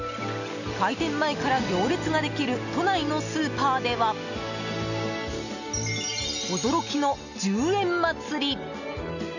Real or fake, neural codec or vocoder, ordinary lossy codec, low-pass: real; none; none; 7.2 kHz